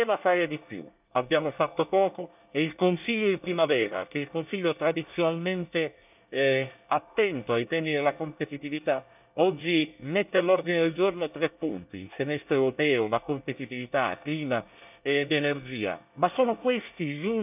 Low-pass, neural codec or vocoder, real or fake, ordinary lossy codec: 3.6 kHz; codec, 24 kHz, 1 kbps, SNAC; fake; none